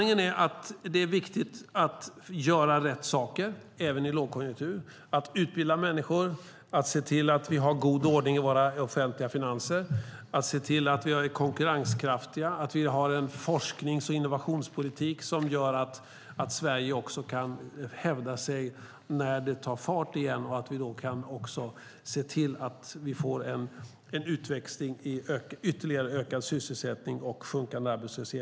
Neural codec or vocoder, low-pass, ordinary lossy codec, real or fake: none; none; none; real